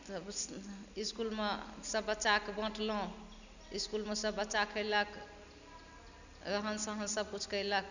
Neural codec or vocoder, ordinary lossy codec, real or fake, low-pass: none; none; real; 7.2 kHz